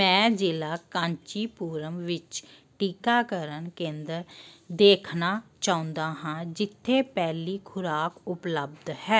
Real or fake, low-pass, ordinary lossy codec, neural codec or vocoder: real; none; none; none